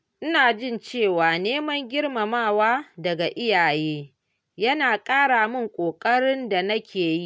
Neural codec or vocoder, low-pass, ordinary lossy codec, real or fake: none; none; none; real